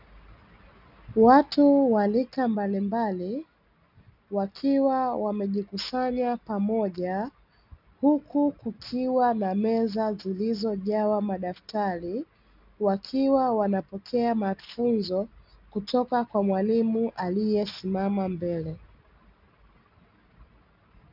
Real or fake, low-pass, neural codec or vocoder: real; 5.4 kHz; none